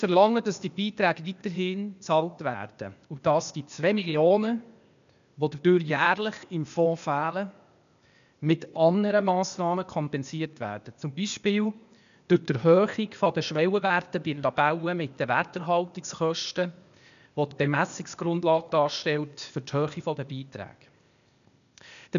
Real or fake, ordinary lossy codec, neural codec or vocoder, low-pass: fake; none; codec, 16 kHz, 0.8 kbps, ZipCodec; 7.2 kHz